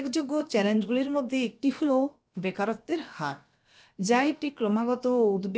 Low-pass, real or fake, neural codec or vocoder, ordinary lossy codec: none; fake; codec, 16 kHz, about 1 kbps, DyCAST, with the encoder's durations; none